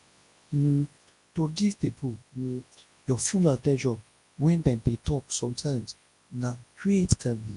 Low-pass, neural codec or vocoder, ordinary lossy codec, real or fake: 10.8 kHz; codec, 24 kHz, 0.9 kbps, WavTokenizer, large speech release; AAC, 48 kbps; fake